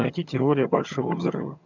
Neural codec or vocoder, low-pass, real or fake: vocoder, 22.05 kHz, 80 mel bands, HiFi-GAN; 7.2 kHz; fake